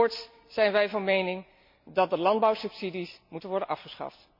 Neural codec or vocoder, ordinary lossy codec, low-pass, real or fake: none; MP3, 48 kbps; 5.4 kHz; real